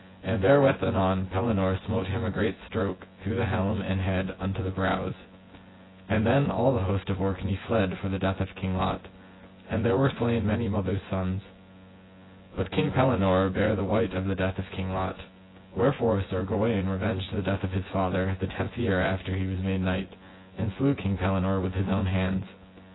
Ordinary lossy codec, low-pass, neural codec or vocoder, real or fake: AAC, 16 kbps; 7.2 kHz; vocoder, 24 kHz, 100 mel bands, Vocos; fake